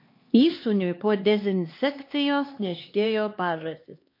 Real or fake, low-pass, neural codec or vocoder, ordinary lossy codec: fake; 5.4 kHz; codec, 16 kHz, 2 kbps, X-Codec, HuBERT features, trained on LibriSpeech; MP3, 32 kbps